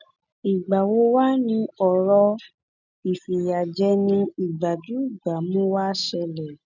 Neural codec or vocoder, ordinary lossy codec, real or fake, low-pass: none; none; real; 7.2 kHz